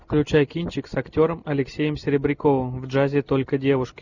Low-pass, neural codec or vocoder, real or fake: 7.2 kHz; none; real